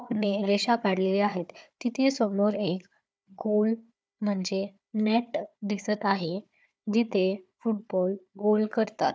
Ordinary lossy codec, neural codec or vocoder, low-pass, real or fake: none; codec, 16 kHz, 4 kbps, FunCodec, trained on Chinese and English, 50 frames a second; none; fake